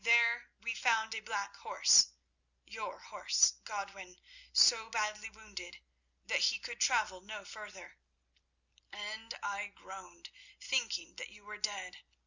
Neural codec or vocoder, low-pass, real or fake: none; 7.2 kHz; real